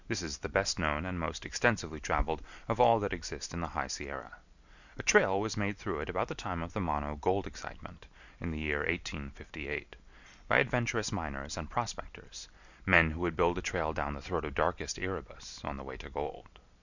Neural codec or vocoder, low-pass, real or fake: none; 7.2 kHz; real